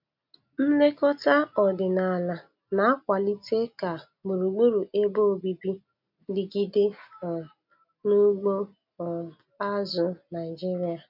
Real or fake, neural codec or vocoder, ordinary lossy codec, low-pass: real; none; none; 5.4 kHz